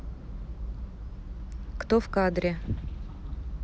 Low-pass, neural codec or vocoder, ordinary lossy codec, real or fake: none; none; none; real